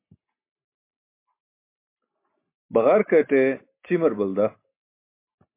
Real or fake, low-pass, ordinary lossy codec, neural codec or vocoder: real; 3.6 kHz; MP3, 24 kbps; none